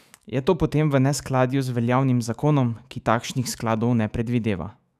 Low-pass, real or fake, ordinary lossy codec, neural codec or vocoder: 14.4 kHz; fake; none; autoencoder, 48 kHz, 128 numbers a frame, DAC-VAE, trained on Japanese speech